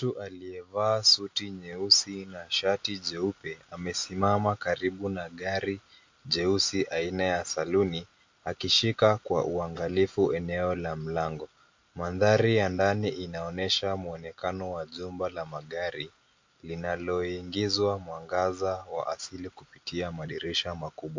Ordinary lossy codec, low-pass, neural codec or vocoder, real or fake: MP3, 48 kbps; 7.2 kHz; none; real